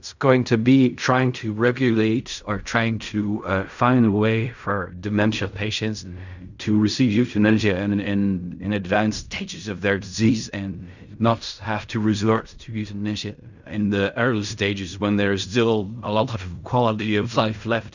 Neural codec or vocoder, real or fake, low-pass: codec, 16 kHz in and 24 kHz out, 0.4 kbps, LongCat-Audio-Codec, fine tuned four codebook decoder; fake; 7.2 kHz